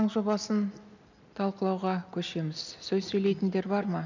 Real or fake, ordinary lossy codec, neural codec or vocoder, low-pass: real; none; none; 7.2 kHz